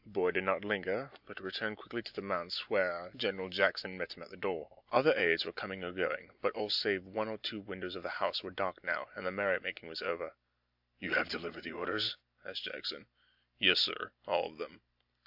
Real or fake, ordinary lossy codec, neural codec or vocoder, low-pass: real; AAC, 48 kbps; none; 5.4 kHz